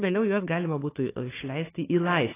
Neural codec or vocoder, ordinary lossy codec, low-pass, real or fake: codec, 16 kHz, 4 kbps, FunCodec, trained on Chinese and English, 50 frames a second; AAC, 16 kbps; 3.6 kHz; fake